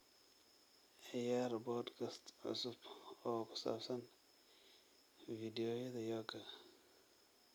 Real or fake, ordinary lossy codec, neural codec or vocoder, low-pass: real; none; none; none